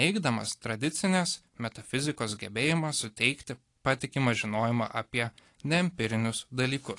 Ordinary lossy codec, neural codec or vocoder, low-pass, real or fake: AAC, 48 kbps; none; 10.8 kHz; real